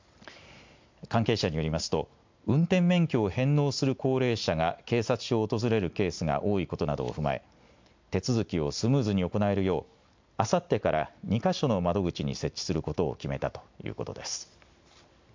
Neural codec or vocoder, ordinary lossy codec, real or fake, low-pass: none; MP3, 64 kbps; real; 7.2 kHz